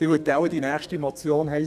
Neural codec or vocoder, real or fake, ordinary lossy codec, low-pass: codec, 32 kHz, 1.9 kbps, SNAC; fake; none; 14.4 kHz